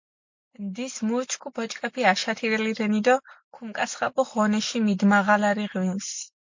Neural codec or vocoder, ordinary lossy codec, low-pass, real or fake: none; MP3, 48 kbps; 7.2 kHz; real